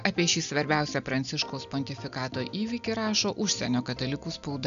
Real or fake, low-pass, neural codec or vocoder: real; 7.2 kHz; none